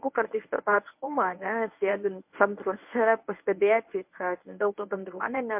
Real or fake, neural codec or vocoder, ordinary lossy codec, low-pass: fake; codec, 24 kHz, 0.9 kbps, WavTokenizer, medium speech release version 1; MP3, 32 kbps; 3.6 kHz